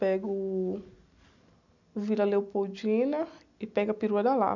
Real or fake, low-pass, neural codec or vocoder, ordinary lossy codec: real; 7.2 kHz; none; none